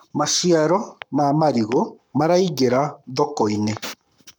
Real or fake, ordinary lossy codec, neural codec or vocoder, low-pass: fake; none; codec, 44.1 kHz, 7.8 kbps, Pupu-Codec; 19.8 kHz